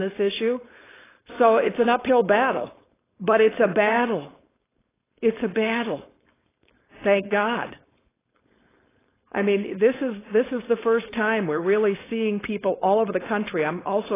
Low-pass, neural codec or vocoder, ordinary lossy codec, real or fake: 3.6 kHz; codec, 16 kHz, 4.8 kbps, FACodec; AAC, 16 kbps; fake